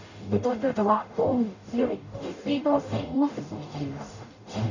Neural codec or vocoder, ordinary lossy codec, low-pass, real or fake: codec, 44.1 kHz, 0.9 kbps, DAC; none; 7.2 kHz; fake